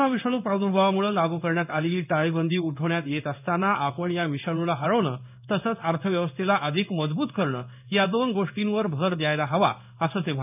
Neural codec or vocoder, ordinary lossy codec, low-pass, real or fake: codec, 16 kHz in and 24 kHz out, 1 kbps, XY-Tokenizer; none; 3.6 kHz; fake